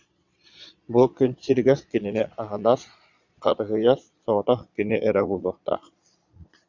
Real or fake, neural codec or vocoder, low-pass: fake; vocoder, 22.05 kHz, 80 mel bands, Vocos; 7.2 kHz